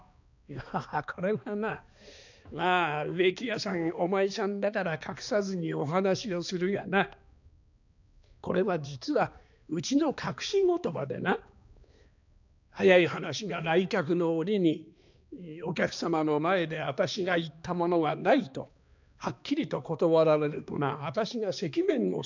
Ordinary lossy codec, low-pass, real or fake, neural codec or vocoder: none; 7.2 kHz; fake; codec, 16 kHz, 2 kbps, X-Codec, HuBERT features, trained on balanced general audio